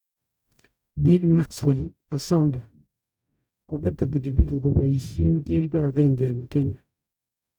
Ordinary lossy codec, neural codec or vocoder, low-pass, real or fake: none; codec, 44.1 kHz, 0.9 kbps, DAC; 19.8 kHz; fake